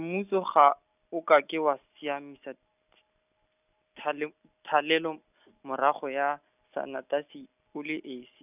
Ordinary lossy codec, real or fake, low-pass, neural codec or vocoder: none; real; 3.6 kHz; none